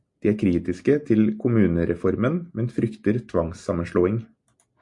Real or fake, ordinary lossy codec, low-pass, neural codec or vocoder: real; MP3, 64 kbps; 10.8 kHz; none